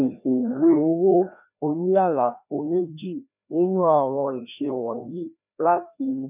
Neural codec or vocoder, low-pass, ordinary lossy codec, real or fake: codec, 16 kHz, 1 kbps, FreqCodec, larger model; 3.6 kHz; none; fake